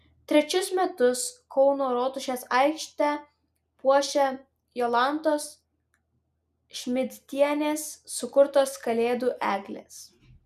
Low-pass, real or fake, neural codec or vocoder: 14.4 kHz; real; none